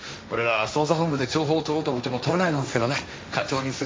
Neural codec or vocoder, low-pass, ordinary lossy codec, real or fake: codec, 16 kHz, 1.1 kbps, Voila-Tokenizer; none; none; fake